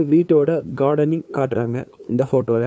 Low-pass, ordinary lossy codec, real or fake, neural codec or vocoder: none; none; fake; codec, 16 kHz, 2 kbps, FunCodec, trained on LibriTTS, 25 frames a second